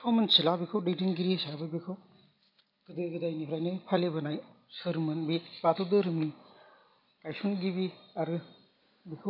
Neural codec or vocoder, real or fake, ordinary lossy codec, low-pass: none; real; none; 5.4 kHz